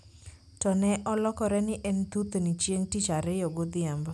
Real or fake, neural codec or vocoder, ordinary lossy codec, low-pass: real; none; none; none